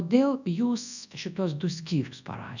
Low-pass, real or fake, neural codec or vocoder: 7.2 kHz; fake; codec, 24 kHz, 0.9 kbps, WavTokenizer, large speech release